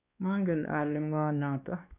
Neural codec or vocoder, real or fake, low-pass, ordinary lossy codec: codec, 16 kHz, 2 kbps, X-Codec, WavLM features, trained on Multilingual LibriSpeech; fake; 3.6 kHz; none